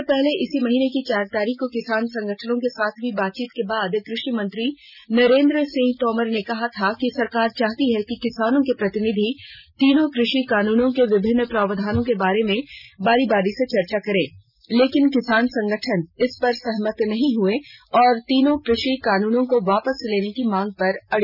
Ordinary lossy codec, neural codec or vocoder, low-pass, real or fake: none; none; 5.4 kHz; real